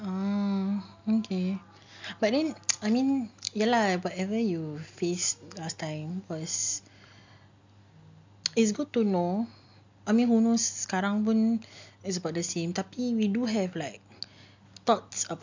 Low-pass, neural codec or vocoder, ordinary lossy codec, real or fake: 7.2 kHz; none; MP3, 64 kbps; real